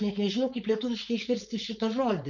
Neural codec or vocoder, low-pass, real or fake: codec, 16 kHz, 4.8 kbps, FACodec; 7.2 kHz; fake